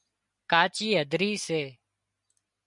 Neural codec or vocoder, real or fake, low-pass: none; real; 10.8 kHz